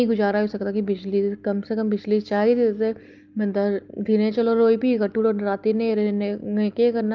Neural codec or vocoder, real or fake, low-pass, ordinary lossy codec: none; real; 7.2 kHz; Opus, 24 kbps